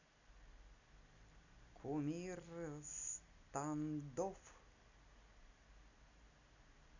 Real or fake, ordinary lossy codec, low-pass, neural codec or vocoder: real; none; 7.2 kHz; none